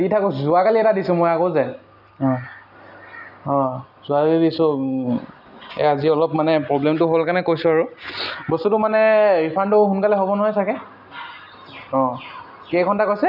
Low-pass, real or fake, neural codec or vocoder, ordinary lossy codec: 5.4 kHz; real; none; none